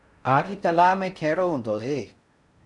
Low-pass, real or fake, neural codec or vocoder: 10.8 kHz; fake; codec, 16 kHz in and 24 kHz out, 0.6 kbps, FocalCodec, streaming, 4096 codes